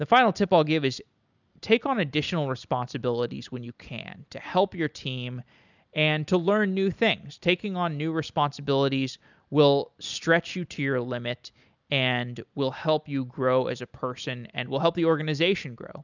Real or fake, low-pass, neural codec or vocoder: real; 7.2 kHz; none